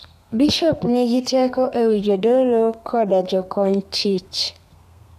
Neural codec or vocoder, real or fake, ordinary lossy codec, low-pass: codec, 32 kHz, 1.9 kbps, SNAC; fake; none; 14.4 kHz